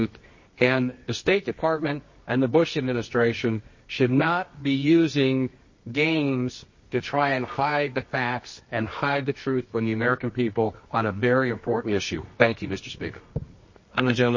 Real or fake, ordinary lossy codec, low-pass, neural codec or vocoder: fake; MP3, 32 kbps; 7.2 kHz; codec, 24 kHz, 0.9 kbps, WavTokenizer, medium music audio release